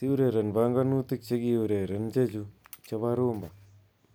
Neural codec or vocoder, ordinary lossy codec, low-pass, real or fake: none; none; none; real